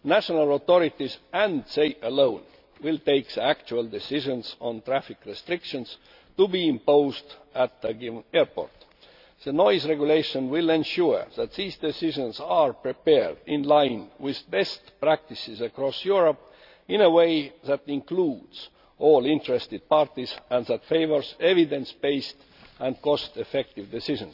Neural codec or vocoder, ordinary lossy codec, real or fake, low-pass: none; none; real; 5.4 kHz